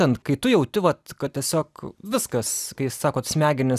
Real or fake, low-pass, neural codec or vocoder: fake; 14.4 kHz; vocoder, 44.1 kHz, 128 mel bands every 256 samples, BigVGAN v2